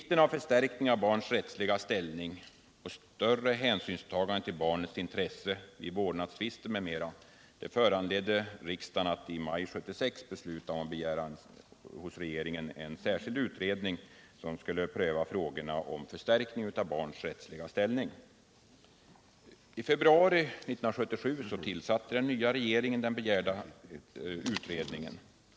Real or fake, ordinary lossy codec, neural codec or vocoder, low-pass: real; none; none; none